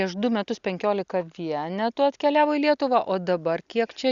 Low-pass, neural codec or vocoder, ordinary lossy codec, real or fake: 7.2 kHz; none; Opus, 64 kbps; real